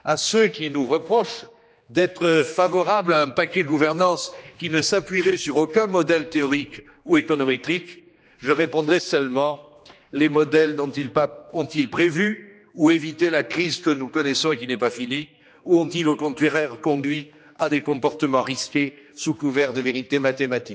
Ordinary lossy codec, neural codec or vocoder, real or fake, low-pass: none; codec, 16 kHz, 2 kbps, X-Codec, HuBERT features, trained on general audio; fake; none